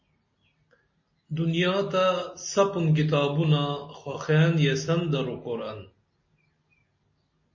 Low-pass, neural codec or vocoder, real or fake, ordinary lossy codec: 7.2 kHz; none; real; MP3, 32 kbps